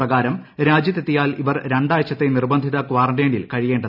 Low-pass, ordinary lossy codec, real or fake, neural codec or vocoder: 5.4 kHz; none; real; none